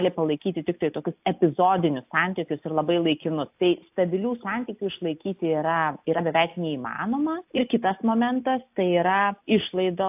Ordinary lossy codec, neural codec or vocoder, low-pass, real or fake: AAC, 32 kbps; none; 3.6 kHz; real